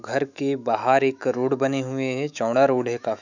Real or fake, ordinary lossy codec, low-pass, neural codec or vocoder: fake; none; 7.2 kHz; autoencoder, 48 kHz, 128 numbers a frame, DAC-VAE, trained on Japanese speech